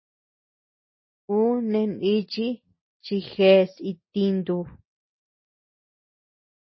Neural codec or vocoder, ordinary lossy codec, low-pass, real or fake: none; MP3, 24 kbps; 7.2 kHz; real